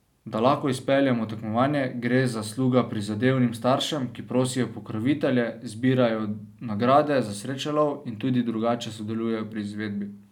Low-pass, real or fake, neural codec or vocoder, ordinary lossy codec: 19.8 kHz; real; none; none